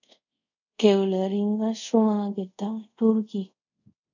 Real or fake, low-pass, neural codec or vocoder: fake; 7.2 kHz; codec, 24 kHz, 0.5 kbps, DualCodec